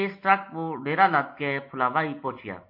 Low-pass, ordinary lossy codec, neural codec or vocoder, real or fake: 5.4 kHz; MP3, 32 kbps; none; real